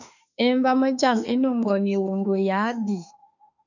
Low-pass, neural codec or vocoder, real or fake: 7.2 kHz; autoencoder, 48 kHz, 32 numbers a frame, DAC-VAE, trained on Japanese speech; fake